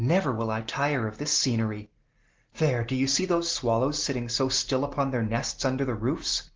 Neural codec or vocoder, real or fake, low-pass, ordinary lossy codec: none; real; 7.2 kHz; Opus, 16 kbps